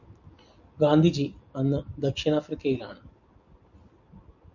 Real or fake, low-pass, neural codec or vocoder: real; 7.2 kHz; none